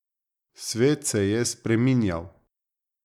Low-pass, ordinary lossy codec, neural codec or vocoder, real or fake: 19.8 kHz; none; none; real